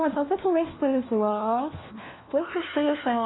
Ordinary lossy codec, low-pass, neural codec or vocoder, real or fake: AAC, 16 kbps; 7.2 kHz; codec, 16 kHz, 1 kbps, FunCodec, trained on LibriTTS, 50 frames a second; fake